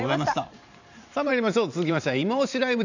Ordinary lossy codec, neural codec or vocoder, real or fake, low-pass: none; vocoder, 44.1 kHz, 128 mel bands every 512 samples, BigVGAN v2; fake; 7.2 kHz